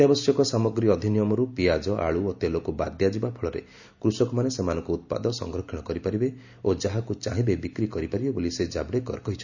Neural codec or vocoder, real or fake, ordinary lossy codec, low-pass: none; real; none; 7.2 kHz